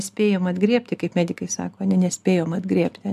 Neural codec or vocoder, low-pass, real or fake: none; 14.4 kHz; real